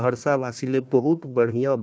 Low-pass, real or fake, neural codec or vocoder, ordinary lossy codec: none; fake; codec, 16 kHz, 1 kbps, FunCodec, trained on Chinese and English, 50 frames a second; none